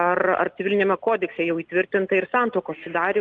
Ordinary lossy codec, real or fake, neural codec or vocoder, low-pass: Opus, 24 kbps; real; none; 9.9 kHz